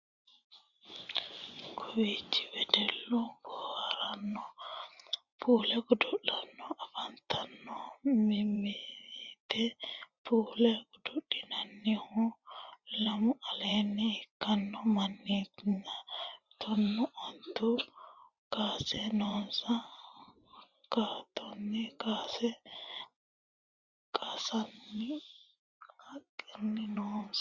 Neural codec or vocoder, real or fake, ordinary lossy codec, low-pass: vocoder, 24 kHz, 100 mel bands, Vocos; fake; Opus, 64 kbps; 7.2 kHz